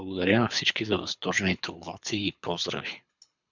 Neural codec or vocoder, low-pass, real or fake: codec, 24 kHz, 6 kbps, HILCodec; 7.2 kHz; fake